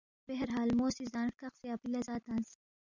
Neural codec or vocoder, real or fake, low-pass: none; real; 7.2 kHz